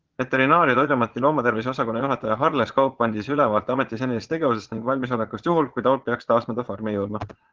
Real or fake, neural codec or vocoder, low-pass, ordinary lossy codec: fake; vocoder, 22.05 kHz, 80 mel bands, Vocos; 7.2 kHz; Opus, 16 kbps